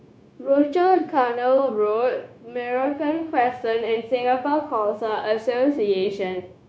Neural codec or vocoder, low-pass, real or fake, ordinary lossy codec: codec, 16 kHz, 0.9 kbps, LongCat-Audio-Codec; none; fake; none